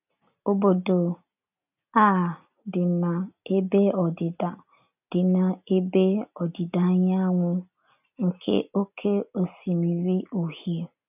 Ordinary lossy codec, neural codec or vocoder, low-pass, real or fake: none; none; 3.6 kHz; real